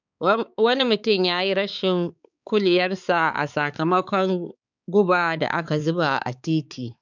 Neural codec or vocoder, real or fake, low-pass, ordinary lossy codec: codec, 16 kHz, 4 kbps, X-Codec, HuBERT features, trained on balanced general audio; fake; 7.2 kHz; none